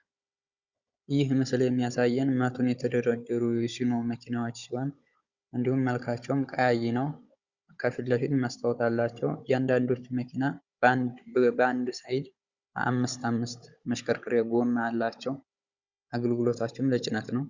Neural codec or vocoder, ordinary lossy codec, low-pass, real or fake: codec, 16 kHz, 16 kbps, FunCodec, trained on Chinese and English, 50 frames a second; Opus, 64 kbps; 7.2 kHz; fake